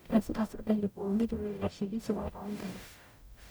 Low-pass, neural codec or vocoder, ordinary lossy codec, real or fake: none; codec, 44.1 kHz, 0.9 kbps, DAC; none; fake